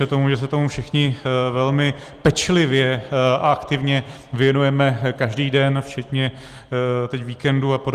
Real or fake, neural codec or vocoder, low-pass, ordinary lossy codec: real; none; 14.4 kHz; Opus, 24 kbps